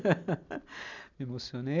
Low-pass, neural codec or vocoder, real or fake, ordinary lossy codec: 7.2 kHz; none; real; none